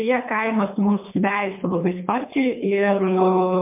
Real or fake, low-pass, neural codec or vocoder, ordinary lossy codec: fake; 3.6 kHz; codec, 24 kHz, 3 kbps, HILCodec; MP3, 32 kbps